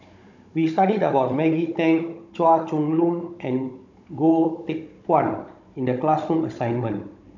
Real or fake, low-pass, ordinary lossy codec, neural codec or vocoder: fake; 7.2 kHz; none; codec, 16 kHz, 16 kbps, FunCodec, trained on Chinese and English, 50 frames a second